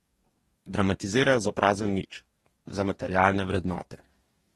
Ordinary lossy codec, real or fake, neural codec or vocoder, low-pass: AAC, 32 kbps; fake; codec, 44.1 kHz, 2.6 kbps, DAC; 19.8 kHz